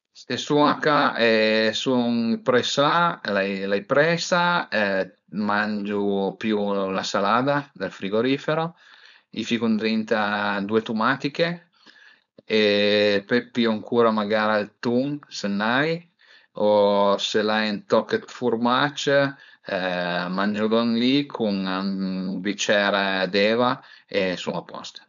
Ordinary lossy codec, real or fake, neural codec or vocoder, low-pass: none; fake; codec, 16 kHz, 4.8 kbps, FACodec; 7.2 kHz